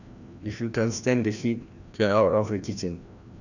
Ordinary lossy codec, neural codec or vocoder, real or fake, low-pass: none; codec, 16 kHz, 1 kbps, FreqCodec, larger model; fake; 7.2 kHz